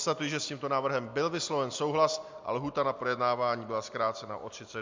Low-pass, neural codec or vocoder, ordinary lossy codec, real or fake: 7.2 kHz; none; MP3, 64 kbps; real